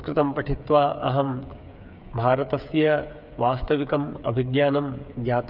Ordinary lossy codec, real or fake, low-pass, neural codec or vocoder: none; fake; 5.4 kHz; codec, 16 kHz, 8 kbps, FreqCodec, smaller model